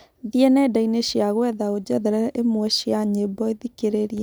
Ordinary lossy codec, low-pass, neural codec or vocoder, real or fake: none; none; none; real